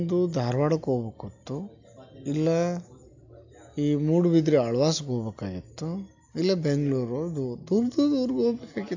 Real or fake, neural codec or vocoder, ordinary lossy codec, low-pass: real; none; AAC, 48 kbps; 7.2 kHz